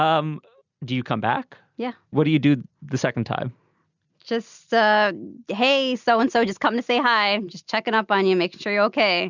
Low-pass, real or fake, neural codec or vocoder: 7.2 kHz; real; none